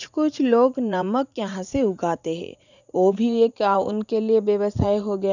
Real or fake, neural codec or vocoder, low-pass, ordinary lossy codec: fake; vocoder, 44.1 kHz, 80 mel bands, Vocos; 7.2 kHz; none